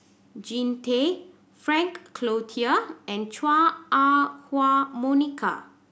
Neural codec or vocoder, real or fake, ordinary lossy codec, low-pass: none; real; none; none